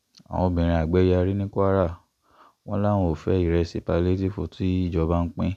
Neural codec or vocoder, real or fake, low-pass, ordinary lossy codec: none; real; 14.4 kHz; none